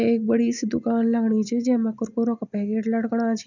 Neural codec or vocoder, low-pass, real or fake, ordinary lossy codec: none; 7.2 kHz; real; none